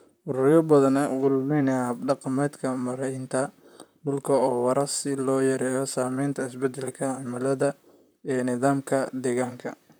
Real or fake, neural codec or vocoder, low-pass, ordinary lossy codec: fake; vocoder, 44.1 kHz, 128 mel bands, Pupu-Vocoder; none; none